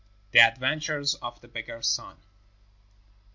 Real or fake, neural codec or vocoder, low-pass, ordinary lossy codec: real; none; 7.2 kHz; MP3, 48 kbps